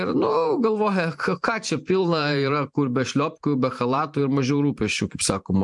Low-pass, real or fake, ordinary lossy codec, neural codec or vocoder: 10.8 kHz; fake; MP3, 64 kbps; vocoder, 44.1 kHz, 128 mel bands every 512 samples, BigVGAN v2